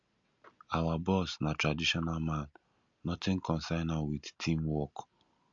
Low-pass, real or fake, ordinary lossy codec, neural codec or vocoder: 7.2 kHz; real; MP3, 64 kbps; none